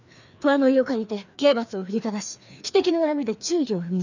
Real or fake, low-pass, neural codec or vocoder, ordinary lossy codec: fake; 7.2 kHz; codec, 16 kHz, 2 kbps, FreqCodec, larger model; none